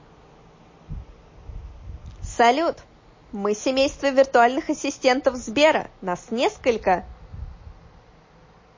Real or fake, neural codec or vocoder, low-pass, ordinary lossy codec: real; none; 7.2 kHz; MP3, 32 kbps